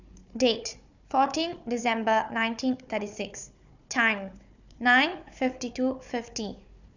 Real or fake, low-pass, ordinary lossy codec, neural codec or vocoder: fake; 7.2 kHz; none; codec, 16 kHz, 4 kbps, FunCodec, trained on Chinese and English, 50 frames a second